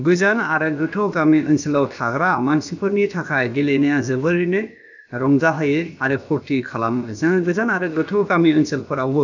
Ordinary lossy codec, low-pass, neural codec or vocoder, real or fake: none; 7.2 kHz; codec, 16 kHz, about 1 kbps, DyCAST, with the encoder's durations; fake